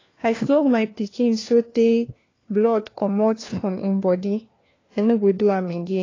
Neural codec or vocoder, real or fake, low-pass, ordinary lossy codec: codec, 16 kHz, 1 kbps, FunCodec, trained on LibriTTS, 50 frames a second; fake; 7.2 kHz; AAC, 32 kbps